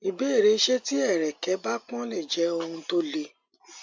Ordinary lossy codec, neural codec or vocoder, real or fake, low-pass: MP3, 48 kbps; none; real; 7.2 kHz